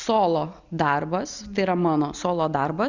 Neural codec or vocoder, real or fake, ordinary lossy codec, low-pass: none; real; Opus, 64 kbps; 7.2 kHz